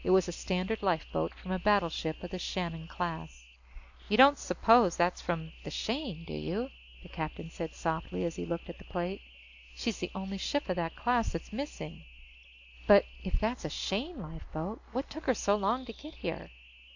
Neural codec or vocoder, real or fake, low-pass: none; real; 7.2 kHz